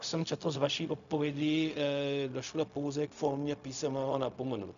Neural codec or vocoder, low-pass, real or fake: codec, 16 kHz, 0.4 kbps, LongCat-Audio-Codec; 7.2 kHz; fake